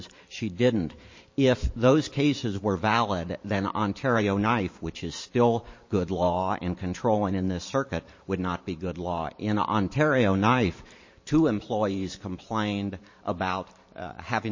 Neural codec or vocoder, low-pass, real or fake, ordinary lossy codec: vocoder, 44.1 kHz, 128 mel bands every 512 samples, BigVGAN v2; 7.2 kHz; fake; MP3, 32 kbps